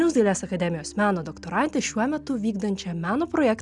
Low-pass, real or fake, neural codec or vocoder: 10.8 kHz; real; none